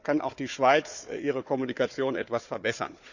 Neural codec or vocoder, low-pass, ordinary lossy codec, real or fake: codec, 16 kHz, 4 kbps, FunCodec, trained on Chinese and English, 50 frames a second; 7.2 kHz; none; fake